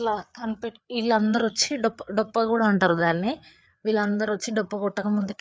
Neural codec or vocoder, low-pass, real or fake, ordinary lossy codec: codec, 16 kHz, 4 kbps, FreqCodec, larger model; none; fake; none